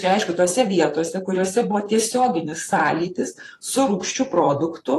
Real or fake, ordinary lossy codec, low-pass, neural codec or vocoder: fake; AAC, 48 kbps; 14.4 kHz; vocoder, 44.1 kHz, 128 mel bands, Pupu-Vocoder